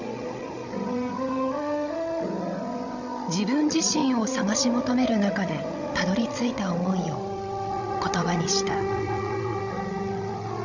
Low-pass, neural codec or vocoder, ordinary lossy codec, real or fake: 7.2 kHz; codec, 16 kHz, 16 kbps, FreqCodec, larger model; none; fake